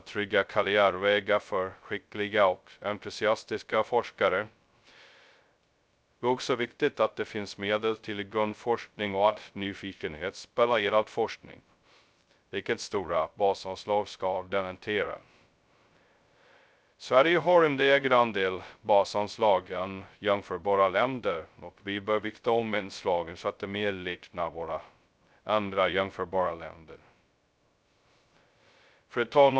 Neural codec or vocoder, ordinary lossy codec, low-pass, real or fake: codec, 16 kHz, 0.2 kbps, FocalCodec; none; none; fake